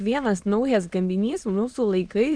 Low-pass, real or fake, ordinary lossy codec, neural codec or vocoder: 9.9 kHz; fake; Opus, 64 kbps; autoencoder, 22.05 kHz, a latent of 192 numbers a frame, VITS, trained on many speakers